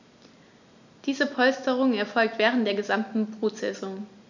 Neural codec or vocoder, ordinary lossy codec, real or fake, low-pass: none; none; real; 7.2 kHz